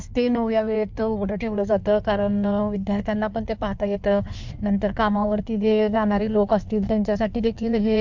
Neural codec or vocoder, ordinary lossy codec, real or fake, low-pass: codec, 16 kHz in and 24 kHz out, 1.1 kbps, FireRedTTS-2 codec; MP3, 64 kbps; fake; 7.2 kHz